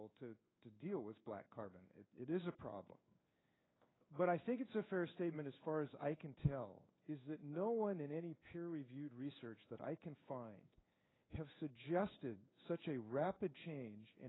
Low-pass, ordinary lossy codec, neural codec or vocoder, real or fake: 7.2 kHz; AAC, 16 kbps; codec, 16 kHz in and 24 kHz out, 1 kbps, XY-Tokenizer; fake